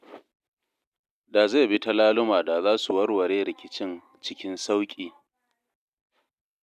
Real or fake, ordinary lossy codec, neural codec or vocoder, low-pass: real; none; none; 14.4 kHz